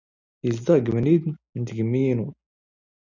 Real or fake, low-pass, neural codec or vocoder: real; 7.2 kHz; none